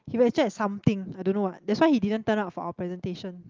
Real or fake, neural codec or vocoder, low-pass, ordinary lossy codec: real; none; 7.2 kHz; Opus, 32 kbps